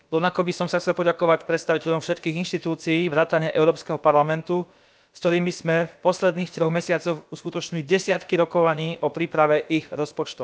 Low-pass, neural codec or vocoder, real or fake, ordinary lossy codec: none; codec, 16 kHz, about 1 kbps, DyCAST, with the encoder's durations; fake; none